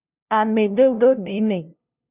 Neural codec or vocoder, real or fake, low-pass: codec, 16 kHz, 0.5 kbps, FunCodec, trained on LibriTTS, 25 frames a second; fake; 3.6 kHz